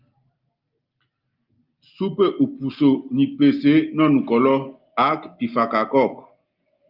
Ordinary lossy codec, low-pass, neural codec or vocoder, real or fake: Opus, 24 kbps; 5.4 kHz; none; real